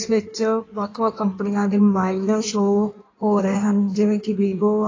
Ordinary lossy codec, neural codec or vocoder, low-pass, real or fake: AAC, 32 kbps; codec, 16 kHz in and 24 kHz out, 1.1 kbps, FireRedTTS-2 codec; 7.2 kHz; fake